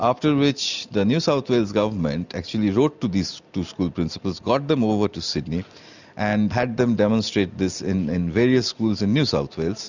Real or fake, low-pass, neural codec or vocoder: real; 7.2 kHz; none